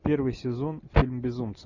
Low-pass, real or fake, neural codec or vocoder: 7.2 kHz; real; none